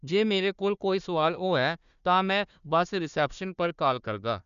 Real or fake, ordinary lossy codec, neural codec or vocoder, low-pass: fake; none; codec, 16 kHz, 1 kbps, FunCodec, trained on Chinese and English, 50 frames a second; 7.2 kHz